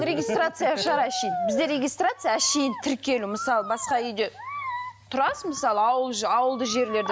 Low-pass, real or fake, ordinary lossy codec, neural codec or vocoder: none; real; none; none